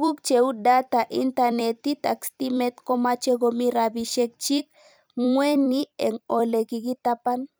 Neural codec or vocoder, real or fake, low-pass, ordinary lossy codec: vocoder, 44.1 kHz, 128 mel bands every 256 samples, BigVGAN v2; fake; none; none